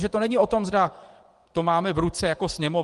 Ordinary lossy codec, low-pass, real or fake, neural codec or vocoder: Opus, 24 kbps; 10.8 kHz; fake; vocoder, 24 kHz, 100 mel bands, Vocos